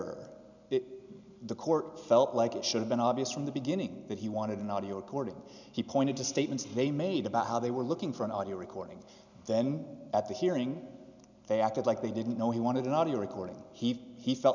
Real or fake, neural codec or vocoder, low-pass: real; none; 7.2 kHz